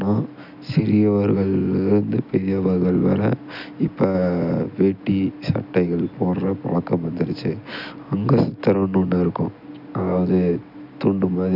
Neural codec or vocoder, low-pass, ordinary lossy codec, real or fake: none; 5.4 kHz; none; real